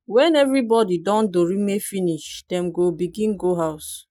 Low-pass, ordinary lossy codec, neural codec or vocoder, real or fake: 19.8 kHz; none; none; real